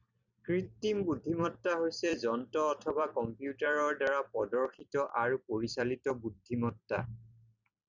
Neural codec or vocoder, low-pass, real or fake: vocoder, 44.1 kHz, 128 mel bands every 256 samples, BigVGAN v2; 7.2 kHz; fake